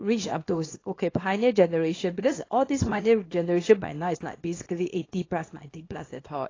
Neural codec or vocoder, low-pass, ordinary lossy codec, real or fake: codec, 24 kHz, 0.9 kbps, WavTokenizer, small release; 7.2 kHz; AAC, 32 kbps; fake